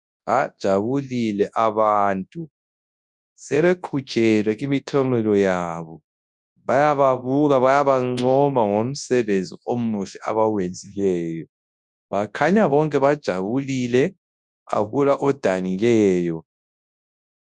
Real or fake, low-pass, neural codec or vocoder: fake; 10.8 kHz; codec, 24 kHz, 0.9 kbps, WavTokenizer, large speech release